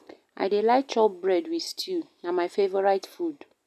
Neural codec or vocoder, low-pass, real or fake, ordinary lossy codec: none; 14.4 kHz; real; none